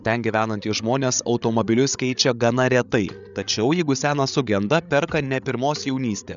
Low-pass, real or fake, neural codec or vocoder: 7.2 kHz; fake; codec, 16 kHz, 8 kbps, FreqCodec, larger model